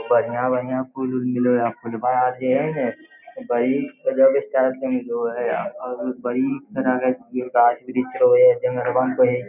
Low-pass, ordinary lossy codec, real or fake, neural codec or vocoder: 3.6 kHz; none; real; none